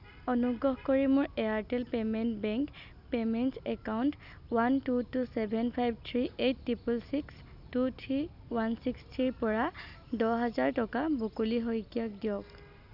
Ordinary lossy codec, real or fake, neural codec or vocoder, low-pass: none; real; none; 5.4 kHz